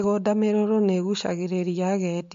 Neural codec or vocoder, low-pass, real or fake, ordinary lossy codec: none; 7.2 kHz; real; MP3, 48 kbps